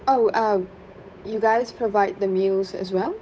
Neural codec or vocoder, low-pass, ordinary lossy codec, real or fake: codec, 16 kHz, 8 kbps, FunCodec, trained on Chinese and English, 25 frames a second; none; none; fake